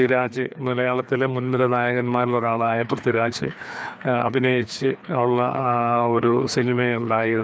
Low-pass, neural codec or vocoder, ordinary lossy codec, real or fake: none; codec, 16 kHz, 2 kbps, FreqCodec, larger model; none; fake